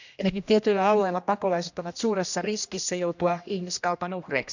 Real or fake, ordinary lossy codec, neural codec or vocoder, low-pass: fake; none; codec, 16 kHz, 1 kbps, X-Codec, HuBERT features, trained on general audio; 7.2 kHz